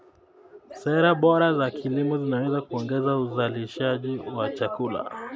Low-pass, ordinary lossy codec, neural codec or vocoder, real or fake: none; none; none; real